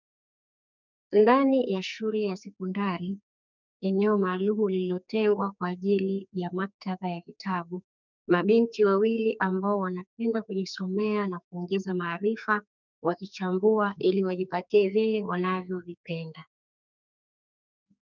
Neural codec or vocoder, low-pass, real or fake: codec, 44.1 kHz, 2.6 kbps, SNAC; 7.2 kHz; fake